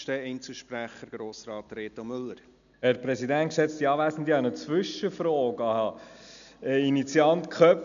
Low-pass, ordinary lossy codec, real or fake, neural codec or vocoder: 7.2 kHz; none; real; none